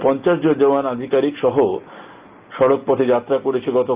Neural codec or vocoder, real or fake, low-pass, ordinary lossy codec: none; real; 3.6 kHz; Opus, 32 kbps